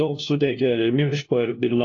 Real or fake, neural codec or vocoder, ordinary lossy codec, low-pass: fake; codec, 16 kHz, 1 kbps, FunCodec, trained on LibriTTS, 50 frames a second; AAC, 32 kbps; 7.2 kHz